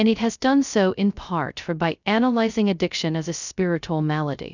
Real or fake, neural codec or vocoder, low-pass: fake; codec, 16 kHz, 0.2 kbps, FocalCodec; 7.2 kHz